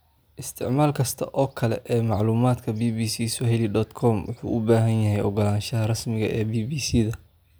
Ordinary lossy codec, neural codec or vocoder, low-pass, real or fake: none; none; none; real